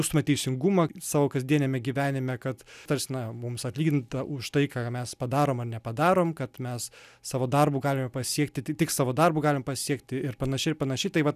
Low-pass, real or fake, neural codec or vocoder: 14.4 kHz; real; none